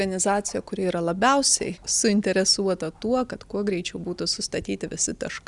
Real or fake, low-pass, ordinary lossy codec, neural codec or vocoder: real; 10.8 kHz; Opus, 64 kbps; none